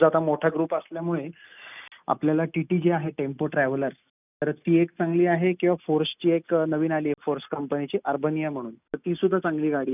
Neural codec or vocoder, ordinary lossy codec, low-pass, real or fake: none; none; 3.6 kHz; real